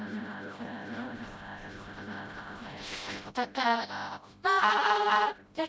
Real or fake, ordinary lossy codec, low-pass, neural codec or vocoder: fake; none; none; codec, 16 kHz, 0.5 kbps, FreqCodec, smaller model